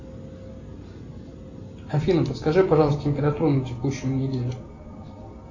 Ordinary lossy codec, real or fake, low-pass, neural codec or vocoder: AAC, 48 kbps; real; 7.2 kHz; none